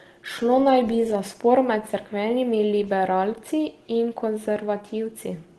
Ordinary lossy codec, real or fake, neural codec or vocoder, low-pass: Opus, 16 kbps; real; none; 10.8 kHz